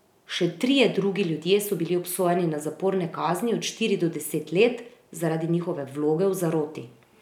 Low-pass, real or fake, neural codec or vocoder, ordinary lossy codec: 19.8 kHz; real; none; none